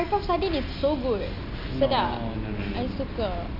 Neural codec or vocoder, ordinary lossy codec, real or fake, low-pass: none; none; real; 5.4 kHz